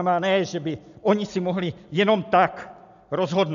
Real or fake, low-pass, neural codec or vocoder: real; 7.2 kHz; none